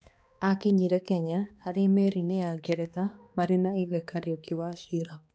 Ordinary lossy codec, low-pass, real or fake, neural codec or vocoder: none; none; fake; codec, 16 kHz, 2 kbps, X-Codec, HuBERT features, trained on balanced general audio